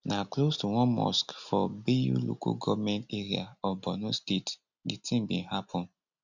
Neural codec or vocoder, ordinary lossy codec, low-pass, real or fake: none; none; 7.2 kHz; real